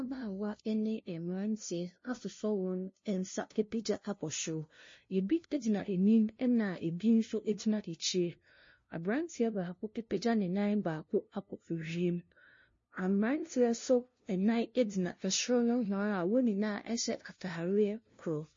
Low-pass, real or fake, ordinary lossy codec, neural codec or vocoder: 7.2 kHz; fake; MP3, 32 kbps; codec, 16 kHz, 0.5 kbps, FunCodec, trained on LibriTTS, 25 frames a second